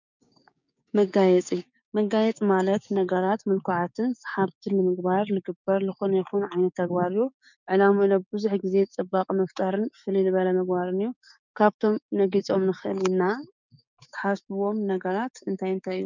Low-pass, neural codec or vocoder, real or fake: 7.2 kHz; codec, 16 kHz, 6 kbps, DAC; fake